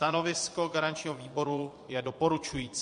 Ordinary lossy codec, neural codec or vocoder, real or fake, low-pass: MP3, 48 kbps; vocoder, 22.05 kHz, 80 mel bands, Vocos; fake; 9.9 kHz